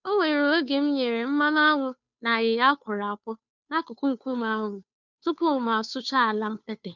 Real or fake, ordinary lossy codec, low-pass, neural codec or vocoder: fake; none; 7.2 kHz; codec, 16 kHz, 2 kbps, FunCodec, trained on Chinese and English, 25 frames a second